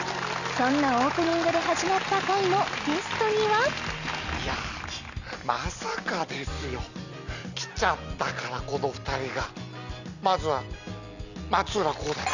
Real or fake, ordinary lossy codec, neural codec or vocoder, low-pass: real; none; none; 7.2 kHz